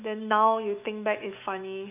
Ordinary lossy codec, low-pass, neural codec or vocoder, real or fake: none; 3.6 kHz; autoencoder, 48 kHz, 128 numbers a frame, DAC-VAE, trained on Japanese speech; fake